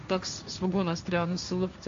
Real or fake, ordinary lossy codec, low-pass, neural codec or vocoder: fake; MP3, 48 kbps; 7.2 kHz; codec, 16 kHz, 1.1 kbps, Voila-Tokenizer